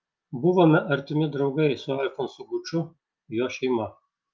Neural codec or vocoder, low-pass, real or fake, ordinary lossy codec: none; 7.2 kHz; real; Opus, 24 kbps